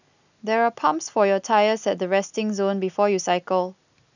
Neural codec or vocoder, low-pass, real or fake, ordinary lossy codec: none; 7.2 kHz; real; none